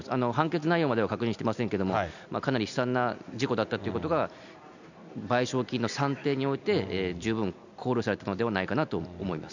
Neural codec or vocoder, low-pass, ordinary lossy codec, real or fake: none; 7.2 kHz; none; real